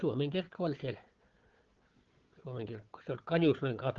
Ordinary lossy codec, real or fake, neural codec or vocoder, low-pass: Opus, 16 kbps; fake; codec, 16 kHz, 4 kbps, FunCodec, trained on Chinese and English, 50 frames a second; 7.2 kHz